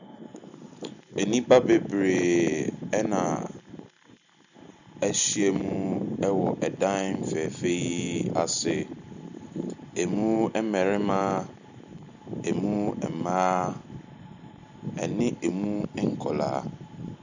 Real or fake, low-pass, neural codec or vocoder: real; 7.2 kHz; none